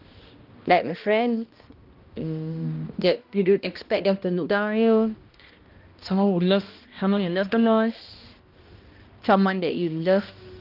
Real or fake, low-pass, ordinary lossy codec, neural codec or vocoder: fake; 5.4 kHz; Opus, 32 kbps; codec, 16 kHz, 1 kbps, X-Codec, HuBERT features, trained on balanced general audio